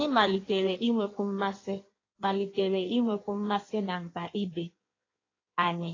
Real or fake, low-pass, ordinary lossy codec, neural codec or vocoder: fake; 7.2 kHz; AAC, 32 kbps; codec, 16 kHz in and 24 kHz out, 1.1 kbps, FireRedTTS-2 codec